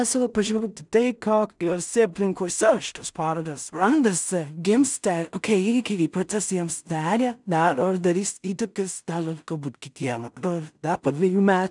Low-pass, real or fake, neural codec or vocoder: 10.8 kHz; fake; codec, 16 kHz in and 24 kHz out, 0.4 kbps, LongCat-Audio-Codec, two codebook decoder